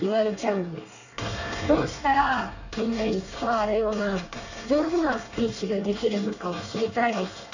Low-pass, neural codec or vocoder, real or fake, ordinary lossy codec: 7.2 kHz; codec, 24 kHz, 1 kbps, SNAC; fake; none